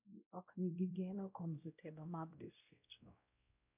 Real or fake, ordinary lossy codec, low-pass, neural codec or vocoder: fake; none; 3.6 kHz; codec, 16 kHz, 0.5 kbps, X-Codec, WavLM features, trained on Multilingual LibriSpeech